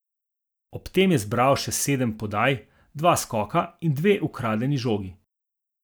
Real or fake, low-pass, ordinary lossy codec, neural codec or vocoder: real; none; none; none